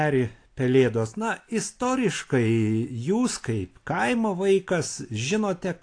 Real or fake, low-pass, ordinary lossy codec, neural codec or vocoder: real; 9.9 kHz; AAC, 48 kbps; none